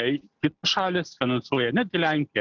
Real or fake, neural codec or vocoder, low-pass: real; none; 7.2 kHz